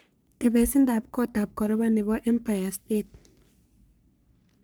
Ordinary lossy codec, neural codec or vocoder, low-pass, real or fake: none; codec, 44.1 kHz, 3.4 kbps, Pupu-Codec; none; fake